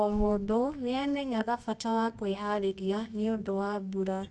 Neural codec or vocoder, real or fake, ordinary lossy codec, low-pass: codec, 24 kHz, 0.9 kbps, WavTokenizer, medium music audio release; fake; none; none